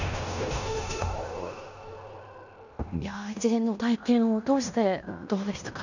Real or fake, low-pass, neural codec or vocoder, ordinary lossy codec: fake; 7.2 kHz; codec, 16 kHz in and 24 kHz out, 0.9 kbps, LongCat-Audio-Codec, fine tuned four codebook decoder; none